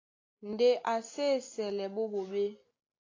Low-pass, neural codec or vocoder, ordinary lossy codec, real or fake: 7.2 kHz; none; AAC, 32 kbps; real